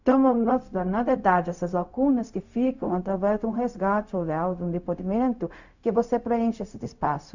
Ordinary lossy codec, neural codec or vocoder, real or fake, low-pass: none; codec, 16 kHz, 0.4 kbps, LongCat-Audio-Codec; fake; 7.2 kHz